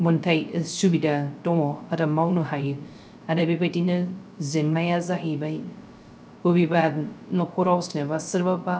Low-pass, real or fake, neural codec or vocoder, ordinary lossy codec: none; fake; codec, 16 kHz, 0.3 kbps, FocalCodec; none